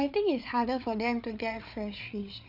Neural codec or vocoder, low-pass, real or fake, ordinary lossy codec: codec, 16 kHz, 8 kbps, FreqCodec, larger model; 5.4 kHz; fake; none